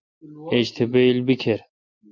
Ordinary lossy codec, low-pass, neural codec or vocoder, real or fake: MP3, 48 kbps; 7.2 kHz; none; real